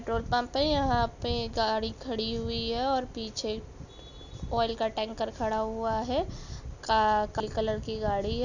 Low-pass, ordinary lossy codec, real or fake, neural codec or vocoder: 7.2 kHz; none; real; none